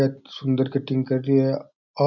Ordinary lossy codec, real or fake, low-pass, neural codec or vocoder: none; real; 7.2 kHz; none